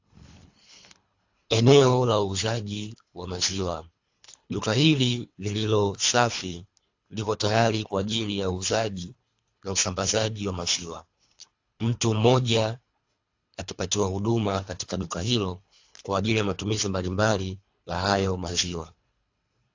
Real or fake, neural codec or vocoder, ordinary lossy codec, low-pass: fake; codec, 24 kHz, 3 kbps, HILCodec; AAC, 48 kbps; 7.2 kHz